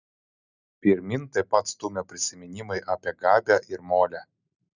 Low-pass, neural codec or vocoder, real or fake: 7.2 kHz; none; real